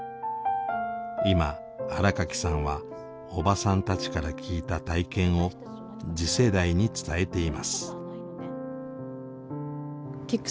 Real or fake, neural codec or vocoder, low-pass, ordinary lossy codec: real; none; none; none